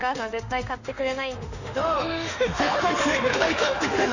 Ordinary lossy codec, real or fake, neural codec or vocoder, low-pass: none; fake; autoencoder, 48 kHz, 32 numbers a frame, DAC-VAE, trained on Japanese speech; 7.2 kHz